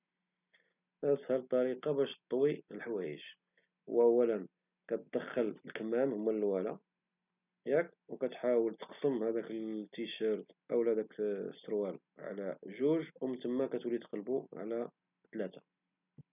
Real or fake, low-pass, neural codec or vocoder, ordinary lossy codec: real; 3.6 kHz; none; none